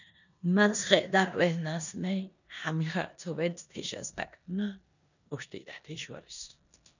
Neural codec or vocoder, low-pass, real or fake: codec, 16 kHz in and 24 kHz out, 0.9 kbps, LongCat-Audio-Codec, four codebook decoder; 7.2 kHz; fake